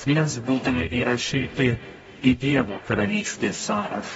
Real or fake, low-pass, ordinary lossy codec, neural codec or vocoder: fake; 19.8 kHz; AAC, 24 kbps; codec, 44.1 kHz, 0.9 kbps, DAC